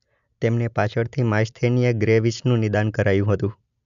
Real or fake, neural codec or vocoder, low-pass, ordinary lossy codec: real; none; 7.2 kHz; MP3, 96 kbps